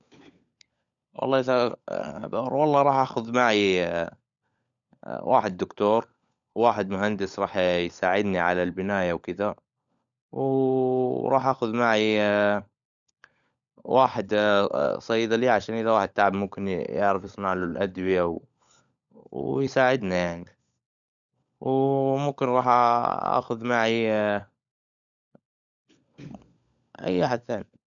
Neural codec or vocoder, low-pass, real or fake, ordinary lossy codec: codec, 16 kHz, 16 kbps, FunCodec, trained on LibriTTS, 50 frames a second; 7.2 kHz; fake; none